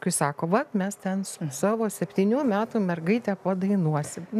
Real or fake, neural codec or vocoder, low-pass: real; none; 14.4 kHz